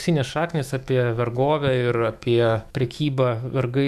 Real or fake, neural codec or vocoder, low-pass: fake; autoencoder, 48 kHz, 128 numbers a frame, DAC-VAE, trained on Japanese speech; 14.4 kHz